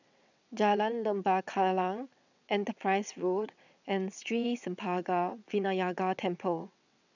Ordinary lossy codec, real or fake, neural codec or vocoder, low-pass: none; fake; vocoder, 22.05 kHz, 80 mel bands, WaveNeXt; 7.2 kHz